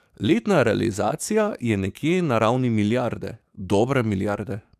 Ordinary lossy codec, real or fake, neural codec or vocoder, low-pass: none; fake; codec, 44.1 kHz, 7.8 kbps, DAC; 14.4 kHz